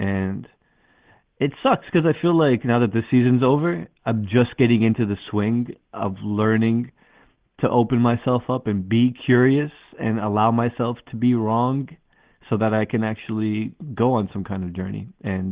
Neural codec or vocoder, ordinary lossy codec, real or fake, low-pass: none; Opus, 16 kbps; real; 3.6 kHz